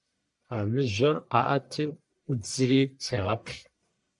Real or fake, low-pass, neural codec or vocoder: fake; 10.8 kHz; codec, 44.1 kHz, 1.7 kbps, Pupu-Codec